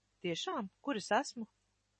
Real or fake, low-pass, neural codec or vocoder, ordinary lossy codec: fake; 10.8 kHz; vocoder, 44.1 kHz, 128 mel bands every 256 samples, BigVGAN v2; MP3, 32 kbps